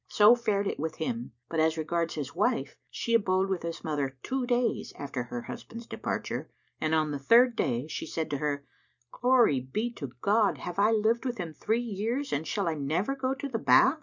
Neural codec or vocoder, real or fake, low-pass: none; real; 7.2 kHz